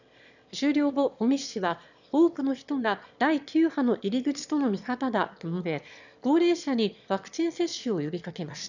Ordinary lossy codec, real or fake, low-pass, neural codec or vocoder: none; fake; 7.2 kHz; autoencoder, 22.05 kHz, a latent of 192 numbers a frame, VITS, trained on one speaker